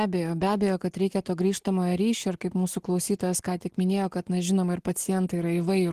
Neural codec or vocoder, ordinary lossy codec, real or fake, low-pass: none; Opus, 16 kbps; real; 14.4 kHz